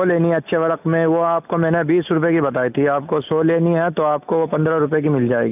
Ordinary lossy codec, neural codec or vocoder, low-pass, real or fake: none; none; 3.6 kHz; real